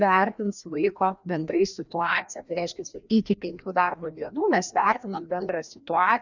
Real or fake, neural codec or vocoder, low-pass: fake; codec, 16 kHz, 1 kbps, FreqCodec, larger model; 7.2 kHz